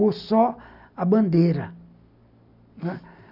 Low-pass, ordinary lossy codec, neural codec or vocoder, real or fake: 5.4 kHz; none; none; real